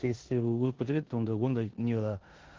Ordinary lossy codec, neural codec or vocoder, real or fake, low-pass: Opus, 16 kbps; codec, 16 kHz in and 24 kHz out, 0.9 kbps, LongCat-Audio-Codec, four codebook decoder; fake; 7.2 kHz